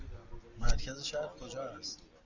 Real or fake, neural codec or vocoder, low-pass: real; none; 7.2 kHz